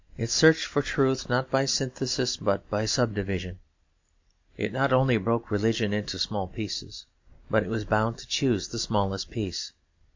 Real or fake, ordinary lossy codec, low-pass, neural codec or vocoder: real; MP3, 48 kbps; 7.2 kHz; none